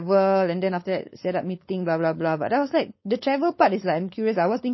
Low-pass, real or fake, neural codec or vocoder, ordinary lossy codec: 7.2 kHz; fake; codec, 16 kHz, 4.8 kbps, FACodec; MP3, 24 kbps